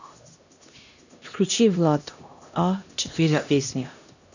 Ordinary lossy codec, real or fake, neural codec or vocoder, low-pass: none; fake; codec, 16 kHz, 1 kbps, X-Codec, HuBERT features, trained on LibriSpeech; 7.2 kHz